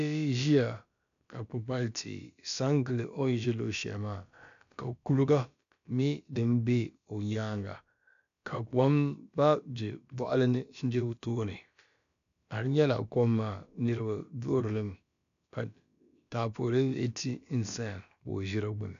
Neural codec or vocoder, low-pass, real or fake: codec, 16 kHz, about 1 kbps, DyCAST, with the encoder's durations; 7.2 kHz; fake